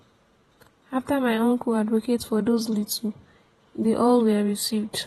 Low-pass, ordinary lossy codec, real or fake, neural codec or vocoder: 19.8 kHz; AAC, 32 kbps; fake; vocoder, 48 kHz, 128 mel bands, Vocos